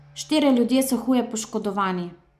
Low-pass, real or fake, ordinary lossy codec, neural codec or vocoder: 14.4 kHz; real; none; none